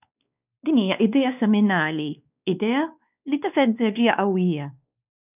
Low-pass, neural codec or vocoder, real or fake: 3.6 kHz; codec, 16 kHz, 2 kbps, X-Codec, WavLM features, trained on Multilingual LibriSpeech; fake